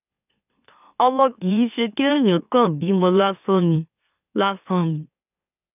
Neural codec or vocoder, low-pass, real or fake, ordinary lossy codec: autoencoder, 44.1 kHz, a latent of 192 numbers a frame, MeloTTS; 3.6 kHz; fake; none